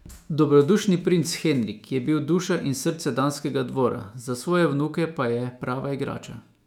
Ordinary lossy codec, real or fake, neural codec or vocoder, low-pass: none; fake; autoencoder, 48 kHz, 128 numbers a frame, DAC-VAE, trained on Japanese speech; 19.8 kHz